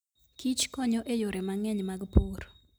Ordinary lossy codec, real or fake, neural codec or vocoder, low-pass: none; real; none; none